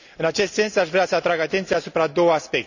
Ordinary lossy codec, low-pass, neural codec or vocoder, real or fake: none; 7.2 kHz; none; real